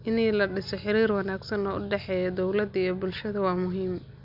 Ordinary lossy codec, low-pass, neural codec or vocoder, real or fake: none; 5.4 kHz; none; real